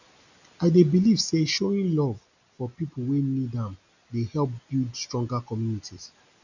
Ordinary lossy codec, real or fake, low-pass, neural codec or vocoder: none; real; 7.2 kHz; none